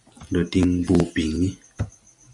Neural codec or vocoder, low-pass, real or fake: none; 10.8 kHz; real